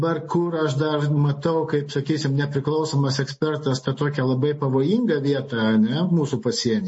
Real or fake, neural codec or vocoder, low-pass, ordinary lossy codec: real; none; 9.9 kHz; MP3, 32 kbps